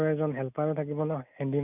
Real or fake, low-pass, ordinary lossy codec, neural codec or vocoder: real; 3.6 kHz; none; none